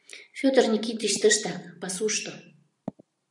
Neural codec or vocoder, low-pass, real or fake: vocoder, 44.1 kHz, 128 mel bands every 512 samples, BigVGAN v2; 10.8 kHz; fake